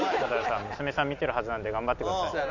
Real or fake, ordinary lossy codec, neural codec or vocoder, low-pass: real; none; none; 7.2 kHz